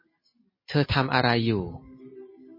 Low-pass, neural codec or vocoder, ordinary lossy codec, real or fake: 5.4 kHz; none; MP3, 24 kbps; real